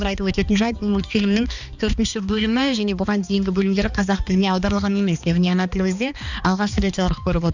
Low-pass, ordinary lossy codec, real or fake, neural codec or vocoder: 7.2 kHz; none; fake; codec, 16 kHz, 2 kbps, X-Codec, HuBERT features, trained on balanced general audio